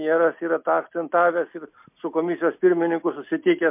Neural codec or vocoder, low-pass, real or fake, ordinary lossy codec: none; 3.6 kHz; real; MP3, 32 kbps